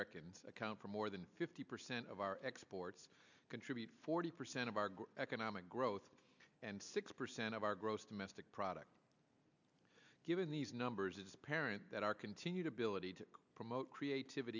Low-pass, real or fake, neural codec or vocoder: 7.2 kHz; real; none